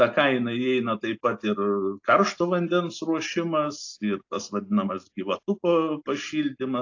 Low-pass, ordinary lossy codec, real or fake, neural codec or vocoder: 7.2 kHz; AAC, 48 kbps; real; none